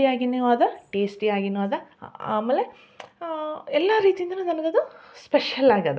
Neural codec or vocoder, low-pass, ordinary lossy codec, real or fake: none; none; none; real